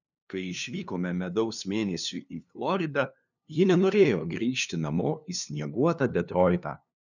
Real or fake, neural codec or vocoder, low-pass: fake; codec, 16 kHz, 2 kbps, FunCodec, trained on LibriTTS, 25 frames a second; 7.2 kHz